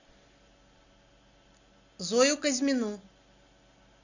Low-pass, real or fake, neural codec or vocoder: 7.2 kHz; real; none